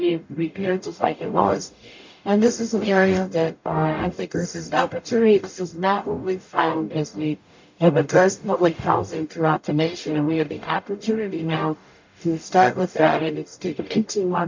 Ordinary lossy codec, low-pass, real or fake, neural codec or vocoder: MP3, 64 kbps; 7.2 kHz; fake; codec, 44.1 kHz, 0.9 kbps, DAC